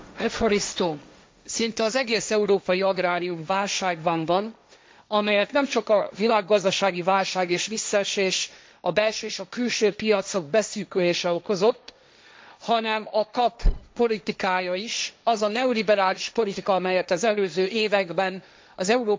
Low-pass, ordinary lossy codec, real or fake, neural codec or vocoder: none; none; fake; codec, 16 kHz, 1.1 kbps, Voila-Tokenizer